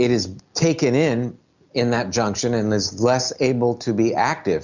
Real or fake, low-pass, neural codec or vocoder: real; 7.2 kHz; none